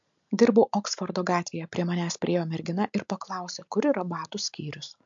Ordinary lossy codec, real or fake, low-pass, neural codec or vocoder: MP3, 64 kbps; real; 7.2 kHz; none